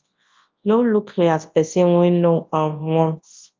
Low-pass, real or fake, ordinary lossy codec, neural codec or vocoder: 7.2 kHz; fake; Opus, 24 kbps; codec, 24 kHz, 0.9 kbps, WavTokenizer, large speech release